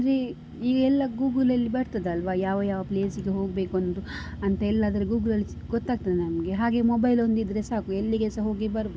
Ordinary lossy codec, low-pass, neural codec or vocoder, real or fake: none; none; none; real